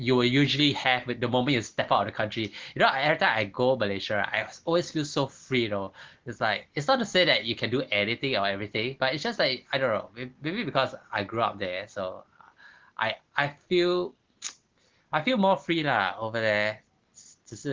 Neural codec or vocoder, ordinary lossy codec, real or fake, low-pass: none; Opus, 16 kbps; real; 7.2 kHz